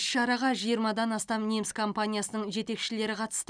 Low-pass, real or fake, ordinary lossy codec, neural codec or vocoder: 9.9 kHz; real; none; none